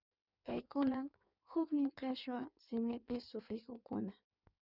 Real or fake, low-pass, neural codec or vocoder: fake; 5.4 kHz; codec, 16 kHz in and 24 kHz out, 1.1 kbps, FireRedTTS-2 codec